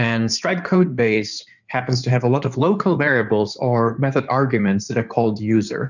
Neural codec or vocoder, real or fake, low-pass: codec, 16 kHz in and 24 kHz out, 2.2 kbps, FireRedTTS-2 codec; fake; 7.2 kHz